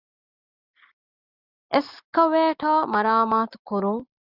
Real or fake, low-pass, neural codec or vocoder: real; 5.4 kHz; none